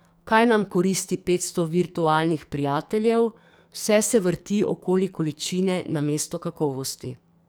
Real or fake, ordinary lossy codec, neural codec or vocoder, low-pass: fake; none; codec, 44.1 kHz, 2.6 kbps, SNAC; none